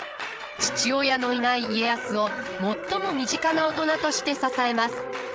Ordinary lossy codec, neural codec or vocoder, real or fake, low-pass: none; codec, 16 kHz, 8 kbps, FreqCodec, larger model; fake; none